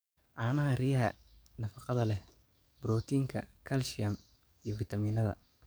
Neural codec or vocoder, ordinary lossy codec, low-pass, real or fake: codec, 44.1 kHz, 7.8 kbps, DAC; none; none; fake